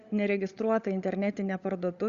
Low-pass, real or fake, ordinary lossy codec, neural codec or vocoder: 7.2 kHz; fake; Opus, 64 kbps; codec, 16 kHz, 16 kbps, FunCodec, trained on LibriTTS, 50 frames a second